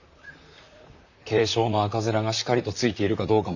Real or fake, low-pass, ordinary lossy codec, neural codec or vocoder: fake; 7.2 kHz; none; vocoder, 44.1 kHz, 128 mel bands, Pupu-Vocoder